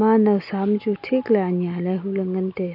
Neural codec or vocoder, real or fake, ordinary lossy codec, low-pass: none; real; AAC, 48 kbps; 5.4 kHz